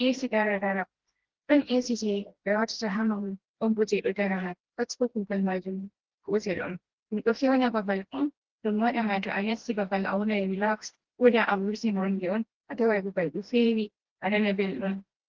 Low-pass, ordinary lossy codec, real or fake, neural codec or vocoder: 7.2 kHz; Opus, 16 kbps; fake; codec, 16 kHz, 1 kbps, FreqCodec, smaller model